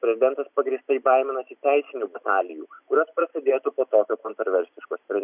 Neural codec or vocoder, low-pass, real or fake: none; 3.6 kHz; real